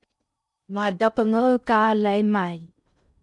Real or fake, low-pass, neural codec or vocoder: fake; 10.8 kHz; codec, 16 kHz in and 24 kHz out, 0.6 kbps, FocalCodec, streaming, 4096 codes